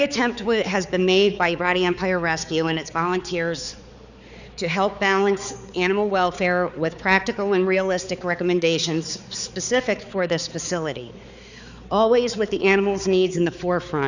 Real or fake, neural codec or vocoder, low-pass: fake; codec, 16 kHz, 4 kbps, X-Codec, HuBERT features, trained on balanced general audio; 7.2 kHz